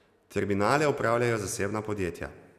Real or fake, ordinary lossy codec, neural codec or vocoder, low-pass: real; AAC, 96 kbps; none; 14.4 kHz